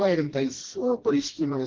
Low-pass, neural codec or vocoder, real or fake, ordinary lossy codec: 7.2 kHz; codec, 16 kHz, 1 kbps, FreqCodec, smaller model; fake; Opus, 16 kbps